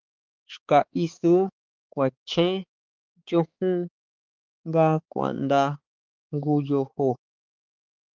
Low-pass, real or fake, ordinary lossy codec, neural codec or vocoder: 7.2 kHz; fake; Opus, 32 kbps; codec, 16 kHz, 4 kbps, X-Codec, HuBERT features, trained on balanced general audio